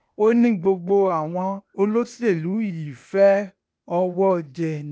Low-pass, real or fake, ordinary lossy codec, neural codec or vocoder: none; fake; none; codec, 16 kHz, 0.8 kbps, ZipCodec